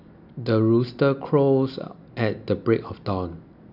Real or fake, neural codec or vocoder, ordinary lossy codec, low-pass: real; none; none; 5.4 kHz